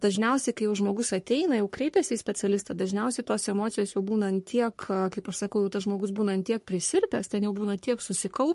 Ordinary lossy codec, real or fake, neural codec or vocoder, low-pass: MP3, 48 kbps; fake; codec, 44.1 kHz, 3.4 kbps, Pupu-Codec; 14.4 kHz